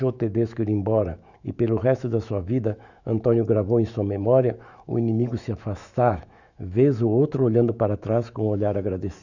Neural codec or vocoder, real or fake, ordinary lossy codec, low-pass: autoencoder, 48 kHz, 128 numbers a frame, DAC-VAE, trained on Japanese speech; fake; none; 7.2 kHz